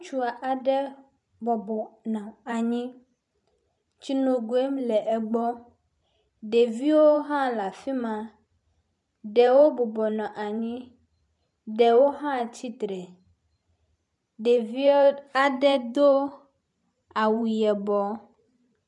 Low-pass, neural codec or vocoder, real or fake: 10.8 kHz; vocoder, 44.1 kHz, 128 mel bands every 512 samples, BigVGAN v2; fake